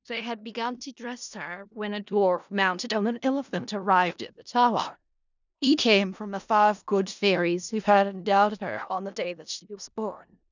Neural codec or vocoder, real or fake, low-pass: codec, 16 kHz in and 24 kHz out, 0.4 kbps, LongCat-Audio-Codec, four codebook decoder; fake; 7.2 kHz